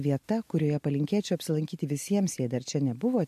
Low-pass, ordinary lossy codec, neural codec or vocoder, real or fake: 14.4 kHz; MP3, 64 kbps; none; real